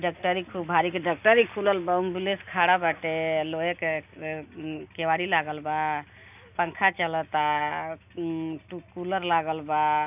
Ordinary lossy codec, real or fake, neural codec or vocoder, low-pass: AAC, 32 kbps; real; none; 3.6 kHz